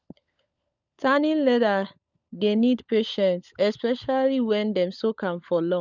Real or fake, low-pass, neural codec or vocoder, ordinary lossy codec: fake; 7.2 kHz; codec, 16 kHz, 16 kbps, FunCodec, trained on LibriTTS, 50 frames a second; none